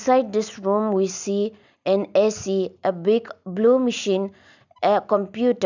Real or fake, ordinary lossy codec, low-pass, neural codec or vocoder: real; none; 7.2 kHz; none